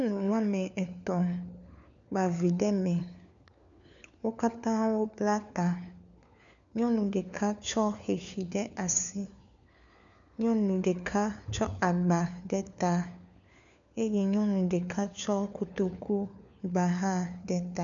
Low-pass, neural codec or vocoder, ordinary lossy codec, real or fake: 7.2 kHz; codec, 16 kHz, 4 kbps, FunCodec, trained on LibriTTS, 50 frames a second; MP3, 96 kbps; fake